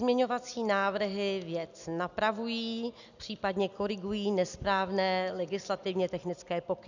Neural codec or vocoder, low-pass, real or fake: none; 7.2 kHz; real